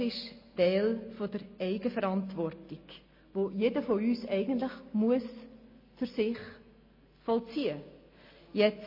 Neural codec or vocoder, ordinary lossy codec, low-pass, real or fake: none; MP3, 24 kbps; 5.4 kHz; real